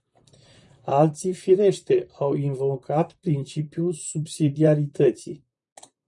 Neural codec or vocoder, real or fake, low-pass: vocoder, 44.1 kHz, 128 mel bands, Pupu-Vocoder; fake; 10.8 kHz